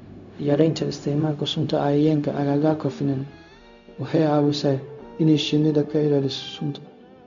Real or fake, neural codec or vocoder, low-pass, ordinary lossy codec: fake; codec, 16 kHz, 0.4 kbps, LongCat-Audio-Codec; 7.2 kHz; none